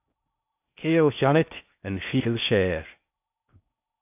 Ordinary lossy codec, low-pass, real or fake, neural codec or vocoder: AAC, 32 kbps; 3.6 kHz; fake; codec, 16 kHz in and 24 kHz out, 0.6 kbps, FocalCodec, streaming, 4096 codes